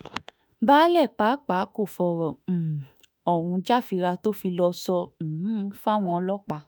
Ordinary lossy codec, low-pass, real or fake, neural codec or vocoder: none; none; fake; autoencoder, 48 kHz, 32 numbers a frame, DAC-VAE, trained on Japanese speech